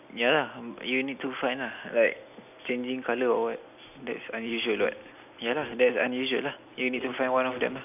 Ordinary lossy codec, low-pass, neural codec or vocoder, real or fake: none; 3.6 kHz; none; real